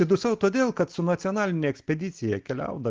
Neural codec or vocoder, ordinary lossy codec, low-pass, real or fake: none; Opus, 16 kbps; 7.2 kHz; real